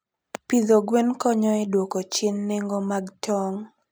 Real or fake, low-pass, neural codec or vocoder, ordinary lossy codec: real; none; none; none